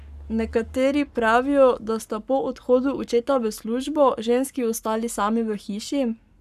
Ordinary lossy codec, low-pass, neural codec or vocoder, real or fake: none; 14.4 kHz; codec, 44.1 kHz, 7.8 kbps, Pupu-Codec; fake